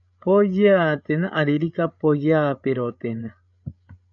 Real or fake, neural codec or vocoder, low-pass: fake; codec, 16 kHz, 8 kbps, FreqCodec, larger model; 7.2 kHz